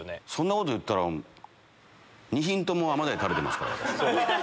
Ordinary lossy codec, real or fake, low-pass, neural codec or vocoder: none; real; none; none